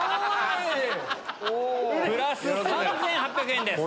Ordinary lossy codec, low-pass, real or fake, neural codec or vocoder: none; none; real; none